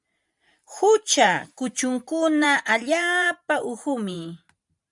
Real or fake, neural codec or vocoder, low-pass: fake; vocoder, 44.1 kHz, 128 mel bands every 512 samples, BigVGAN v2; 10.8 kHz